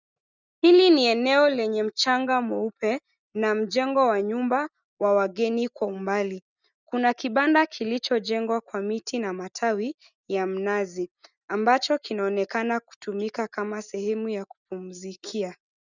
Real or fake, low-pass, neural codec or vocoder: real; 7.2 kHz; none